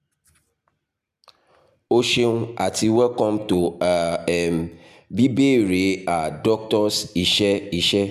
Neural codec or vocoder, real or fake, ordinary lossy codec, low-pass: none; real; none; 14.4 kHz